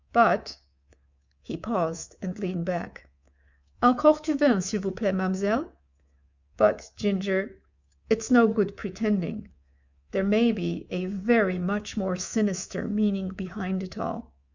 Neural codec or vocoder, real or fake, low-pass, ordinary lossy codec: codec, 24 kHz, 3.1 kbps, DualCodec; fake; 7.2 kHz; Opus, 64 kbps